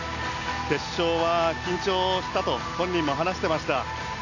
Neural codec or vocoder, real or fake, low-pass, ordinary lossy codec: none; real; 7.2 kHz; none